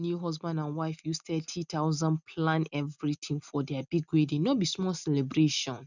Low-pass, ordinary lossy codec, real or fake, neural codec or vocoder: 7.2 kHz; none; real; none